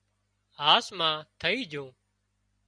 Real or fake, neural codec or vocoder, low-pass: real; none; 9.9 kHz